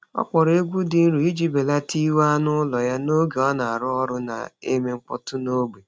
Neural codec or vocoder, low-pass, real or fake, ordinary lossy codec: none; none; real; none